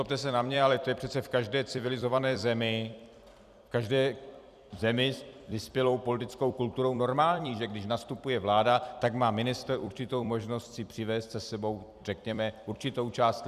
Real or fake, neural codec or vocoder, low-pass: fake; vocoder, 44.1 kHz, 128 mel bands every 256 samples, BigVGAN v2; 14.4 kHz